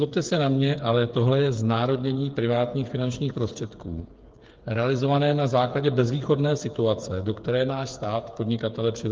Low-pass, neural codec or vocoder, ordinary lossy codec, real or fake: 7.2 kHz; codec, 16 kHz, 8 kbps, FreqCodec, smaller model; Opus, 32 kbps; fake